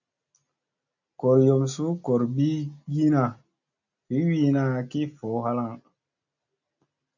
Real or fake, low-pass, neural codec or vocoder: real; 7.2 kHz; none